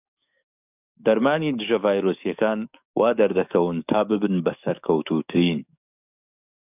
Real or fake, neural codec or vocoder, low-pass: fake; codec, 44.1 kHz, 7.8 kbps, DAC; 3.6 kHz